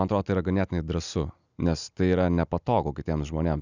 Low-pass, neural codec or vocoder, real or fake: 7.2 kHz; none; real